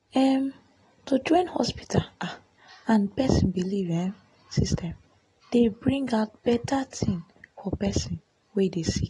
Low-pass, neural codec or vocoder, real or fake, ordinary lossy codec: 10.8 kHz; none; real; AAC, 32 kbps